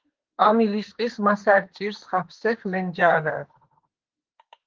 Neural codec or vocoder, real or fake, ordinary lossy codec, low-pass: autoencoder, 48 kHz, 32 numbers a frame, DAC-VAE, trained on Japanese speech; fake; Opus, 16 kbps; 7.2 kHz